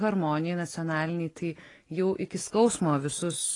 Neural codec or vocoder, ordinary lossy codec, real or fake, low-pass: none; AAC, 32 kbps; real; 10.8 kHz